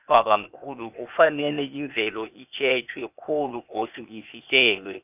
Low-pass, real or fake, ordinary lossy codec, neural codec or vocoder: 3.6 kHz; fake; none; codec, 16 kHz, 0.8 kbps, ZipCodec